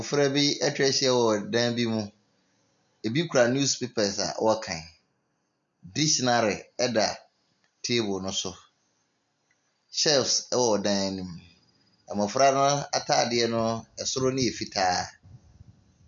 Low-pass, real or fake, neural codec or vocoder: 7.2 kHz; real; none